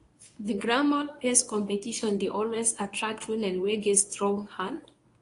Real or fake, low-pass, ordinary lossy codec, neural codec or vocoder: fake; 10.8 kHz; AAC, 48 kbps; codec, 24 kHz, 0.9 kbps, WavTokenizer, medium speech release version 1